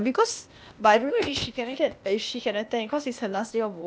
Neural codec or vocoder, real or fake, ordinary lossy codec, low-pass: codec, 16 kHz, 0.8 kbps, ZipCodec; fake; none; none